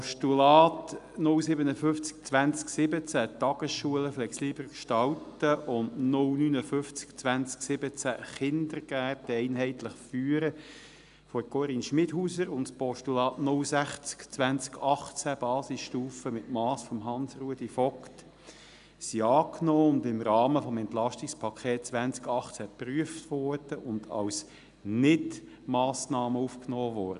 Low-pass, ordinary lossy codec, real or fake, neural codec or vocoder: 10.8 kHz; AAC, 96 kbps; real; none